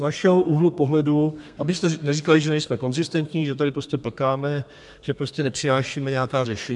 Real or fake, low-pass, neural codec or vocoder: fake; 10.8 kHz; codec, 32 kHz, 1.9 kbps, SNAC